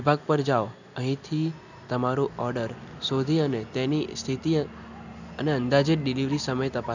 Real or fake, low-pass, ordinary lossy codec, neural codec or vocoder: real; 7.2 kHz; none; none